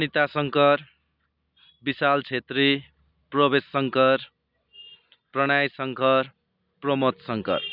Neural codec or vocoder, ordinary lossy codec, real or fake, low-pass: none; none; real; 5.4 kHz